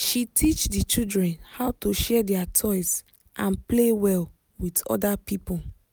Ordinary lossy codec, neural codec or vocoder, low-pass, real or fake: none; none; none; real